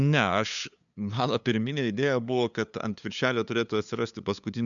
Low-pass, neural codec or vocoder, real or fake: 7.2 kHz; codec, 16 kHz, 2 kbps, FunCodec, trained on LibriTTS, 25 frames a second; fake